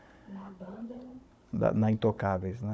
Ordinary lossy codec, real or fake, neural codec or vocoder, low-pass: none; fake; codec, 16 kHz, 16 kbps, FunCodec, trained on Chinese and English, 50 frames a second; none